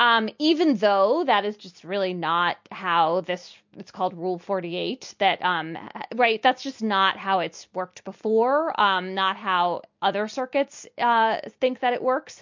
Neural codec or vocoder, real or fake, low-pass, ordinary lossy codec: none; real; 7.2 kHz; MP3, 48 kbps